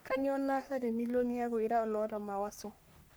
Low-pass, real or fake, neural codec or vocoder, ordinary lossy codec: none; fake; codec, 44.1 kHz, 3.4 kbps, Pupu-Codec; none